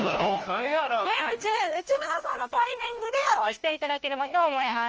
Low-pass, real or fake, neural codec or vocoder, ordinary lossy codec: 7.2 kHz; fake; codec, 16 kHz, 1 kbps, FunCodec, trained on LibriTTS, 50 frames a second; Opus, 24 kbps